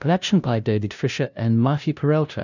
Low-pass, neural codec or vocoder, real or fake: 7.2 kHz; codec, 16 kHz, 0.5 kbps, FunCodec, trained on LibriTTS, 25 frames a second; fake